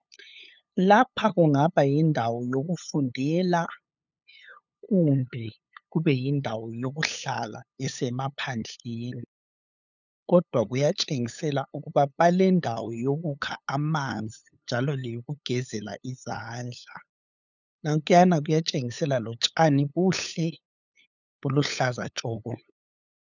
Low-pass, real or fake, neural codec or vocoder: 7.2 kHz; fake; codec, 16 kHz, 8 kbps, FunCodec, trained on LibriTTS, 25 frames a second